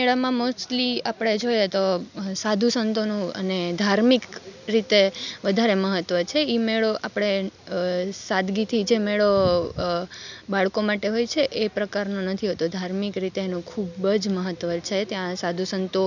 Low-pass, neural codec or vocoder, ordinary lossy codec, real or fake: 7.2 kHz; none; none; real